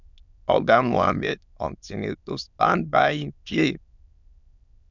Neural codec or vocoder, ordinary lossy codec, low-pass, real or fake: autoencoder, 22.05 kHz, a latent of 192 numbers a frame, VITS, trained on many speakers; none; 7.2 kHz; fake